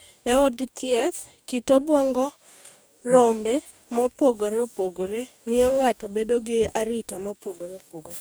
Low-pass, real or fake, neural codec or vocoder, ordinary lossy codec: none; fake; codec, 44.1 kHz, 2.6 kbps, DAC; none